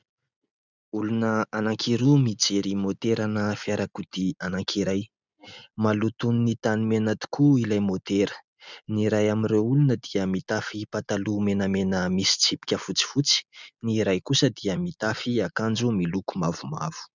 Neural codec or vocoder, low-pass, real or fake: none; 7.2 kHz; real